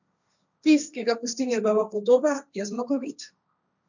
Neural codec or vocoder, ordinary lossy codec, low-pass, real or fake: codec, 16 kHz, 1.1 kbps, Voila-Tokenizer; none; 7.2 kHz; fake